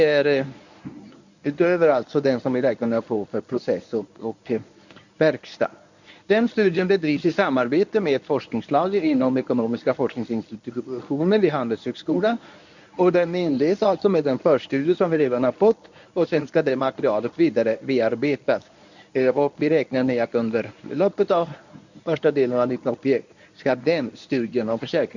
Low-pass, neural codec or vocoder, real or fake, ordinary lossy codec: 7.2 kHz; codec, 24 kHz, 0.9 kbps, WavTokenizer, medium speech release version 1; fake; none